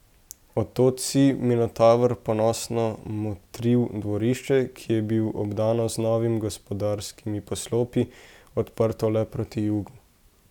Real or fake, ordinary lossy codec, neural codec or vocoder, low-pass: real; none; none; 19.8 kHz